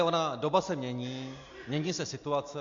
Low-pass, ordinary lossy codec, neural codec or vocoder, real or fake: 7.2 kHz; MP3, 48 kbps; none; real